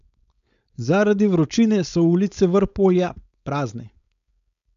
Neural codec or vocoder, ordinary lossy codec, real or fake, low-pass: codec, 16 kHz, 4.8 kbps, FACodec; none; fake; 7.2 kHz